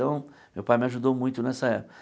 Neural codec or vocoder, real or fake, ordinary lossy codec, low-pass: none; real; none; none